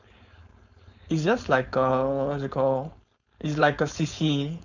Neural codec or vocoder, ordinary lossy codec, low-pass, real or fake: codec, 16 kHz, 4.8 kbps, FACodec; none; 7.2 kHz; fake